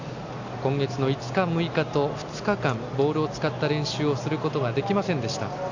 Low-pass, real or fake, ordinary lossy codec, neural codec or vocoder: 7.2 kHz; real; AAC, 48 kbps; none